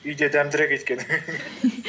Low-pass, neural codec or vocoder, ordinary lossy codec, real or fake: none; none; none; real